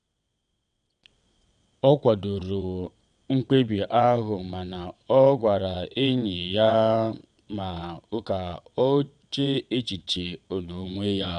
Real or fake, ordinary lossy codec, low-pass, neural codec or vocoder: fake; none; 9.9 kHz; vocoder, 22.05 kHz, 80 mel bands, WaveNeXt